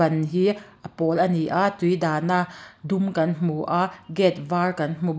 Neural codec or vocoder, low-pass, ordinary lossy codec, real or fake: none; none; none; real